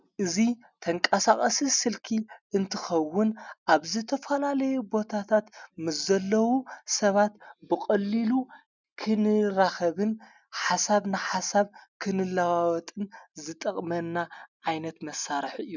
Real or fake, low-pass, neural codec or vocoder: real; 7.2 kHz; none